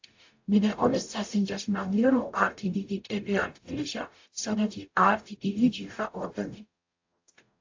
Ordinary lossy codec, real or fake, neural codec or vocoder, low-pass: AAC, 48 kbps; fake; codec, 44.1 kHz, 0.9 kbps, DAC; 7.2 kHz